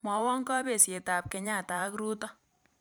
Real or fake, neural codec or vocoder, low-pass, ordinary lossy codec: fake; vocoder, 44.1 kHz, 128 mel bands every 512 samples, BigVGAN v2; none; none